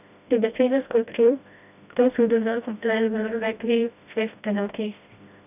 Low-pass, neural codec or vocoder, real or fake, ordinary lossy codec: 3.6 kHz; codec, 16 kHz, 1 kbps, FreqCodec, smaller model; fake; none